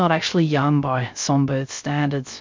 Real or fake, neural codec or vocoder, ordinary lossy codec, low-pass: fake; codec, 16 kHz, 0.3 kbps, FocalCodec; MP3, 64 kbps; 7.2 kHz